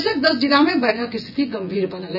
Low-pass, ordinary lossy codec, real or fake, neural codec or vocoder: 5.4 kHz; none; fake; vocoder, 24 kHz, 100 mel bands, Vocos